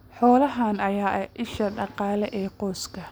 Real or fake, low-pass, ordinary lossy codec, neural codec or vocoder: real; none; none; none